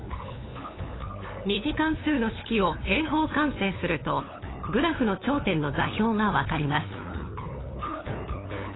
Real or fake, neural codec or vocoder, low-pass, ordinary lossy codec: fake; codec, 16 kHz, 8 kbps, FunCodec, trained on LibriTTS, 25 frames a second; 7.2 kHz; AAC, 16 kbps